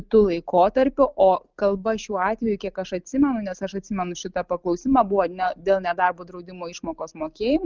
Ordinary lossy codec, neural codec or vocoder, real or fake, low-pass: Opus, 32 kbps; none; real; 7.2 kHz